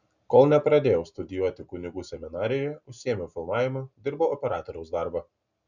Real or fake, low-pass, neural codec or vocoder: real; 7.2 kHz; none